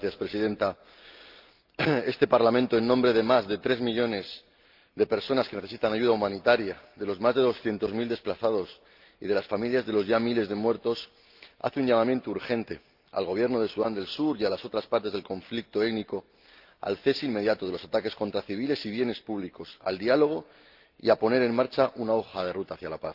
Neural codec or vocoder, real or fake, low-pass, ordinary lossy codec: none; real; 5.4 kHz; Opus, 32 kbps